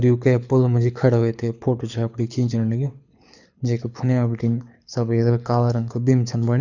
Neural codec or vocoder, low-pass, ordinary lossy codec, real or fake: codec, 24 kHz, 6 kbps, HILCodec; 7.2 kHz; none; fake